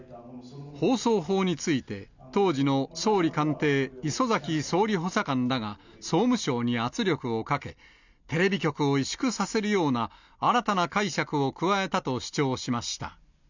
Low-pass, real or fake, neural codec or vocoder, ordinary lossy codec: 7.2 kHz; real; none; none